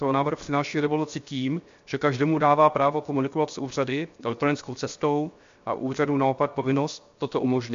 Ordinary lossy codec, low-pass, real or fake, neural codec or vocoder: MP3, 48 kbps; 7.2 kHz; fake; codec, 16 kHz, 0.7 kbps, FocalCodec